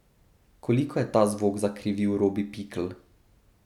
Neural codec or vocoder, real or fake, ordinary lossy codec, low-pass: none; real; none; 19.8 kHz